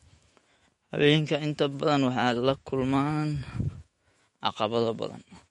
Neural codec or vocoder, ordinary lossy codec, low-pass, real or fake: autoencoder, 48 kHz, 128 numbers a frame, DAC-VAE, trained on Japanese speech; MP3, 48 kbps; 19.8 kHz; fake